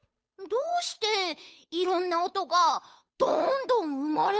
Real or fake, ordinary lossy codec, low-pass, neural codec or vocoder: fake; none; none; codec, 16 kHz, 8 kbps, FunCodec, trained on Chinese and English, 25 frames a second